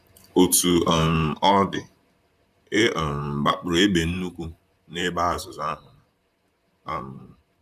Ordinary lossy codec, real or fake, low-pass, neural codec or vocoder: none; fake; 14.4 kHz; vocoder, 44.1 kHz, 128 mel bands, Pupu-Vocoder